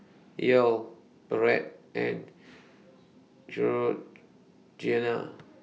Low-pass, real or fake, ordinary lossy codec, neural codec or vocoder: none; real; none; none